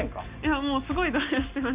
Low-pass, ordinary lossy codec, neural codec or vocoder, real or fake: 3.6 kHz; Opus, 64 kbps; none; real